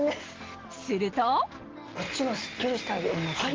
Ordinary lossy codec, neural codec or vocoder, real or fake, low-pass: Opus, 16 kbps; none; real; 7.2 kHz